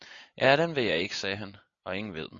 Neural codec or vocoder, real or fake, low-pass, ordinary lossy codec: none; real; 7.2 kHz; AAC, 64 kbps